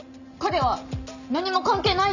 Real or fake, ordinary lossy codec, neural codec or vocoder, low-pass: real; none; none; 7.2 kHz